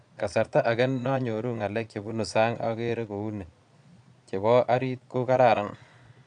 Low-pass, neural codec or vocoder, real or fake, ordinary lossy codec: 9.9 kHz; vocoder, 22.05 kHz, 80 mel bands, Vocos; fake; none